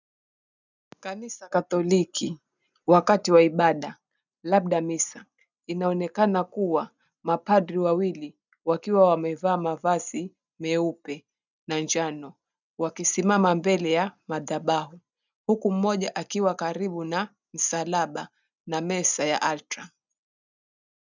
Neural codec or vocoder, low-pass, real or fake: none; 7.2 kHz; real